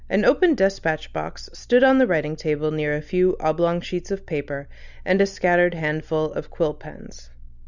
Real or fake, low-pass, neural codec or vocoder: real; 7.2 kHz; none